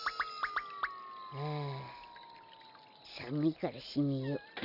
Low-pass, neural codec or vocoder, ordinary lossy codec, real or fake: 5.4 kHz; none; none; real